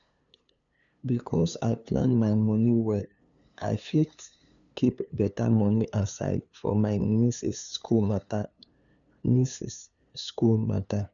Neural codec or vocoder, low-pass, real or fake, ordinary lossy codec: codec, 16 kHz, 2 kbps, FunCodec, trained on LibriTTS, 25 frames a second; 7.2 kHz; fake; none